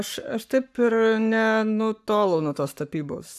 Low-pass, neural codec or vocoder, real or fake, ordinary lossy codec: 14.4 kHz; codec, 44.1 kHz, 7.8 kbps, Pupu-Codec; fake; AAC, 96 kbps